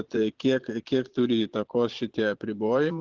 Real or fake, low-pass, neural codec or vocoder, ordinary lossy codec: fake; 7.2 kHz; vocoder, 22.05 kHz, 80 mel bands, Vocos; Opus, 16 kbps